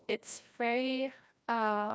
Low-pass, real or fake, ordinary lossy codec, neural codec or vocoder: none; fake; none; codec, 16 kHz, 1 kbps, FreqCodec, larger model